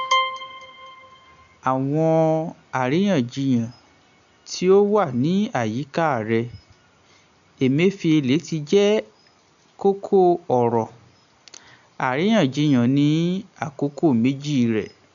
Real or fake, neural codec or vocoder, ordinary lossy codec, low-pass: real; none; none; 7.2 kHz